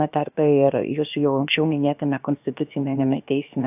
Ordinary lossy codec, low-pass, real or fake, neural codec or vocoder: AAC, 32 kbps; 3.6 kHz; fake; codec, 16 kHz, about 1 kbps, DyCAST, with the encoder's durations